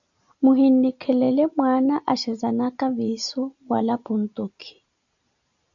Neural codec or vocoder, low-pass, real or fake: none; 7.2 kHz; real